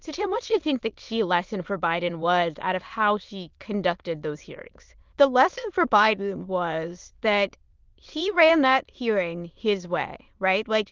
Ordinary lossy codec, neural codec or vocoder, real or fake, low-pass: Opus, 32 kbps; autoencoder, 22.05 kHz, a latent of 192 numbers a frame, VITS, trained on many speakers; fake; 7.2 kHz